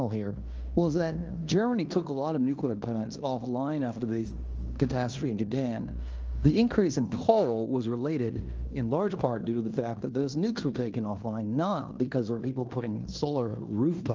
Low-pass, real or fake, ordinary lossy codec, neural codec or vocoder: 7.2 kHz; fake; Opus, 24 kbps; codec, 16 kHz in and 24 kHz out, 0.9 kbps, LongCat-Audio-Codec, fine tuned four codebook decoder